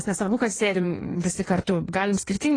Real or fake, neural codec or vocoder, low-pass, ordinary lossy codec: fake; codec, 16 kHz in and 24 kHz out, 1.1 kbps, FireRedTTS-2 codec; 9.9 kHz; AAC, 32 kbps